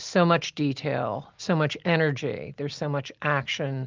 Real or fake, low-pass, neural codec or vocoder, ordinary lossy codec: real; 7.2 kHz; none; Opus, 24 kbps